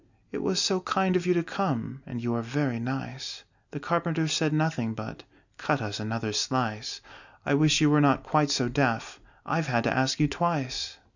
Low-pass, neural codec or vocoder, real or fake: 7.2 kHz; none; real